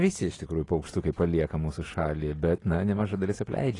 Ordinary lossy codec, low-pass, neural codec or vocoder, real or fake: AAC, 32 kbps; 10.8 kHz; none; real